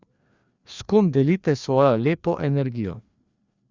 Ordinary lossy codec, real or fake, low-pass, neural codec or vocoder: Opus, 64 kbps; fake; 7.2 kHz; codec, 16 kHz, 2 kbps, FreqCodec, larger model